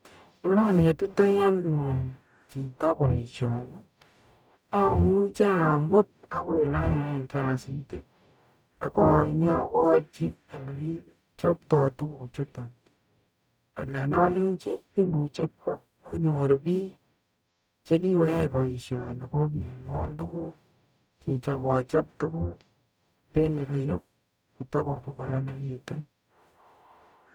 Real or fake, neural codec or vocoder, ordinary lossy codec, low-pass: fake; codec, 44.1 kHz, 0.9 kbps, DAC; none; none